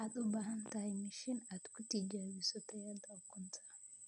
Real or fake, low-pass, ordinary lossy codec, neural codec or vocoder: real; 10.8 kHz; none; none